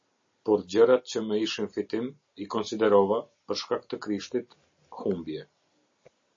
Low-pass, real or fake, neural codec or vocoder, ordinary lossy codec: 7.2 kHz; real; none; MP3, 32 kbps